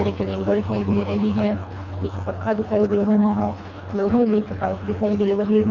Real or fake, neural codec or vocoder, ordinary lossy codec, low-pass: fake; codec, 24 kHz, 1.5 kbps, HILCodec; none; 7.2 kHz